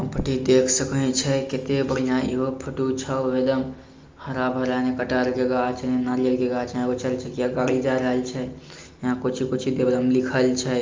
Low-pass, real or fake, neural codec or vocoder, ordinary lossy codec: 7.2 kHz; real; none; Opus, 32 kbps